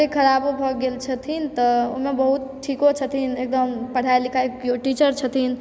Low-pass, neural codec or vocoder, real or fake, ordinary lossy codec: none; none; real; none